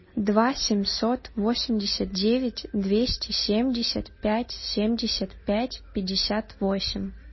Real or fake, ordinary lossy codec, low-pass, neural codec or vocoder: real; MP3, 24 kbps; 7.2 kHz; none